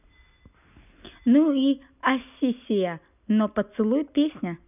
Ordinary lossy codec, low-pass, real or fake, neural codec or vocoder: none; 3.6 kHz; real; none